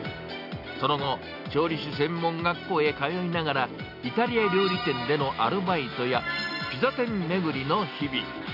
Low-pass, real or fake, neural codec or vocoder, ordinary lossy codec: 5.4 kHz; real; none; none